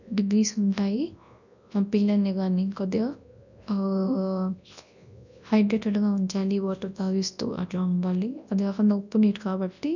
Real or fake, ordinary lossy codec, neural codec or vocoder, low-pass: fake; none; codec, 24 kHz, 0.9 kbps, WavTokenizer, large speech release; 7.2 kHz